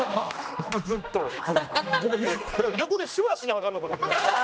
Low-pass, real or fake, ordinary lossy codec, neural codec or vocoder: none; fake; none; codec, 16 kHz, 1 kbps, X-Codec, HuBERT features, trained on general audio